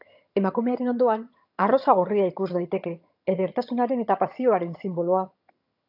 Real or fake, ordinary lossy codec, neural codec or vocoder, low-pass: fake; AAC, 48 kbps; vocoder, 22.05 kHz, 80 mel bands, HiFi-GAN; 5.4 kHz